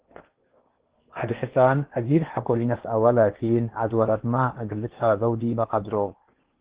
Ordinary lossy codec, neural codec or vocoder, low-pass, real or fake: Opus, 16 kbps; codec, 16 kHz in and 24 kHz out, 0.8 kbps, FocalCodec, streaming, 65536 codes; 3.6 kHz; fake